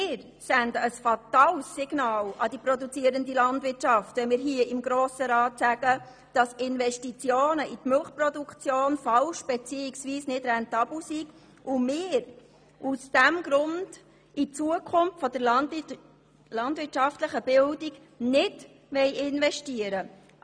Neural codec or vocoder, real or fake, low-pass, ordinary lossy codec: none; real; 9.9 kHz; none